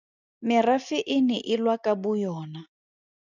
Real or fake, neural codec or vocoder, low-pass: real; none; 7.2 kHz